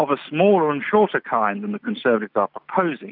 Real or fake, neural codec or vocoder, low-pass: real; none; 5.4 kHz